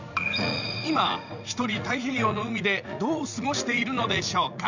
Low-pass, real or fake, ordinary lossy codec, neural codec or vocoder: 7.2 kHz; fake; none; vocoder, 44.1 kHz, 80 mel bands, Vocos